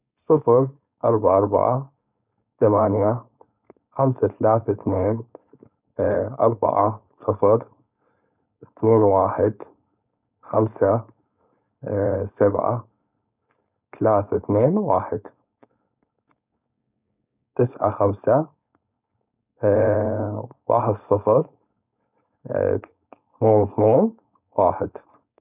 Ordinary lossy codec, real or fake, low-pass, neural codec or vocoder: none; fake; 3.6 kHz; codec, 16 kHz, 4.8 kbps, FACodec